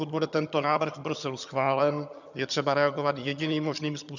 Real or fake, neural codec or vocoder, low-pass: fake; vocoder, 22.05 kHz, 80 mel bands, HiFi-GAN; 7.2 kHz